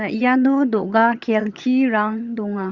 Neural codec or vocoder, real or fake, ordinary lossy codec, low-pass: vocoder, 22.05 kHz, 80 mel bands, HiFi-GAN; fake; Opus, 64 kbps; 7.2 kHz